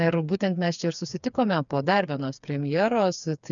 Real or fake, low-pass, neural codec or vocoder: fake; 7.2 kHz; codec, 16 kHz, 4 kbps, FreqCodec, smaller model